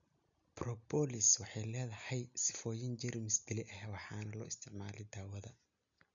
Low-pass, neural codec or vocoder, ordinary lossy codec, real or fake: 7.2 kHz; none; none; real